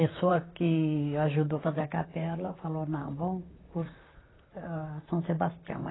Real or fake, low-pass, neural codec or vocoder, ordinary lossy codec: fake; 7.2 kHz; vocoder, 44.1 kHz, 128 mel bands, Pupu-Vocoder; AAC, 16 kbps